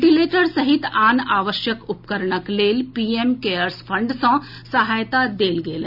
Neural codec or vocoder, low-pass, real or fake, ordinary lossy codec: none; 5.4 kHz; real; none